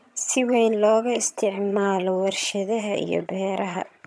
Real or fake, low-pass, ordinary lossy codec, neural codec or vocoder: fake; none; none; vocoder, 22.05 kHz, 80 mel bands, HiFi-GAN